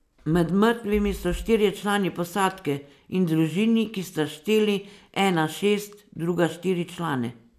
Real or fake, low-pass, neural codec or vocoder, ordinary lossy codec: real; 14.4 kHz; none; none